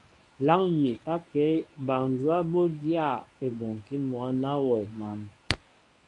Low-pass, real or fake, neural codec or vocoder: 10.8 kHz; fake; codec, 24 kHz, 0.9 kbps, WavTokenizer, medium speech release version 1